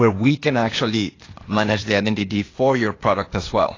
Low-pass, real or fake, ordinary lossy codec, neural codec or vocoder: 7.2 kHz; fake; AAC, 32 kbps; codec, 16 kHz, 0.8 kbps, ZipCodec